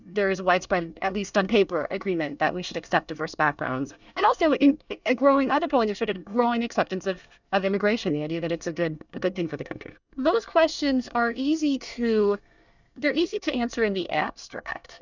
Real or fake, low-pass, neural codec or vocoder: fake; 7.2 kHz; codec, 24 kHz, 1 kbps, SNAC